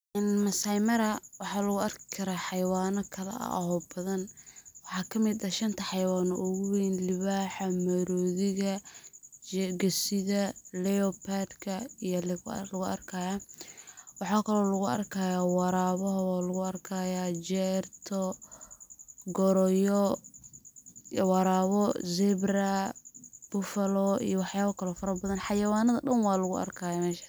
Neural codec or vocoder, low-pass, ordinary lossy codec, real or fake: none; none; none; real